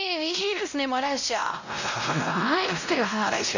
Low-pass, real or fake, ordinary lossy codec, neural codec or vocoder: 7.2 kHz; fake; none; codec, 16 kHz, 0.5 kbps, X-Codec, WavLM features, trained on Multilingual LibriSpeech